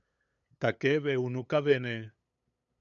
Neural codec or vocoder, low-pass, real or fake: codec, 16 kHz, 8 kbps, FunCodec, trained on LibriTTS, 25 frames a second; 7.2 kHz; fake